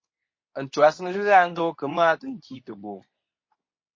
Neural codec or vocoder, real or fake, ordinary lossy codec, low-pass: codec, 24 kHz, 0.9 kbps, WavTokenizer, medium speech release version 2; fake; MP3, 32 kbps; 7.2 kHz